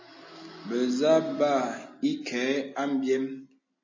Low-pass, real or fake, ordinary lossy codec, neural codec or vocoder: 7.2 kHz; real; MP3, 32 kbps; none